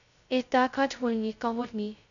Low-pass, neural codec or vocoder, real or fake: 7.2 kHz; codec, 16 kHz, 0.2 kbps, FocalCodec; fake